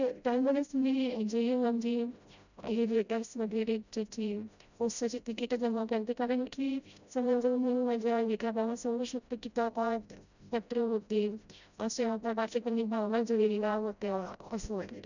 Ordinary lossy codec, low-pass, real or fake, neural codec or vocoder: none; 7.2 kHz; fake; codec, 16 kHz, 0.5 kbps, FreqCodec, smaller model